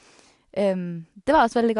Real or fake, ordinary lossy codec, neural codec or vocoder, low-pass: real; AAC, 64 kbps; none; 10.8 kHz